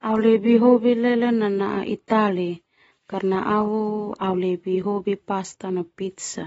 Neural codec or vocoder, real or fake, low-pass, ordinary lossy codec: none; real; 10.8 kHz; AAC, 24 kbps